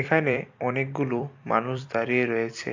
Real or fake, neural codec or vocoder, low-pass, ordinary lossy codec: fake; vocoder, 44.1 kHz, 128 mel bands every 256 samples, BigVGAN v2; 7.2 kHz; AAC, 48 kbps